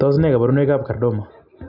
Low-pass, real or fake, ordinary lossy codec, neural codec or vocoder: 5.4 kHz; real; none; none